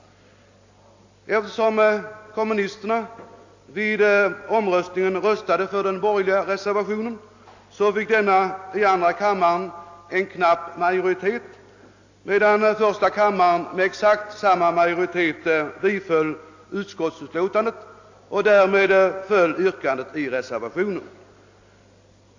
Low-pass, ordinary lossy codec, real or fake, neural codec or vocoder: 7.2 kHz; AAC, 48 kbps; real; none